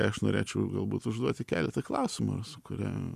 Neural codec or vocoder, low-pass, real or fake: none; 14.4 kHz; real